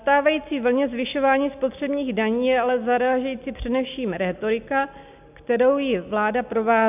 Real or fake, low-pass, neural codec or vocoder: real; 3.6 kHz; none